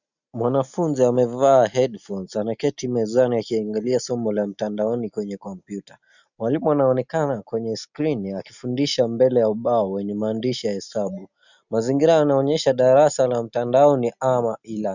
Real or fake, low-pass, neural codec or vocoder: real; 7.2 kHz; none